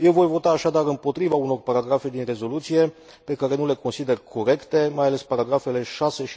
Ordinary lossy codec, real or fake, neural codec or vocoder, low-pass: none; real; none; none